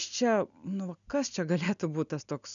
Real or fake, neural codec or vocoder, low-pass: real; none; 7.2 kHz